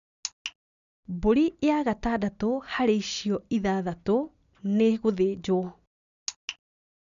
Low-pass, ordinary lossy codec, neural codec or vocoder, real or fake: 7.2 kHz; none; none; real